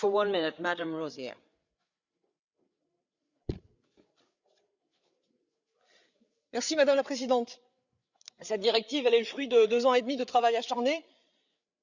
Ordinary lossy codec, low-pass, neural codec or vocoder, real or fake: Opus, 64 kbps; 7.2 kHz; codec, 16 kHz, 8 kbps, FreqCodec, larger model; fake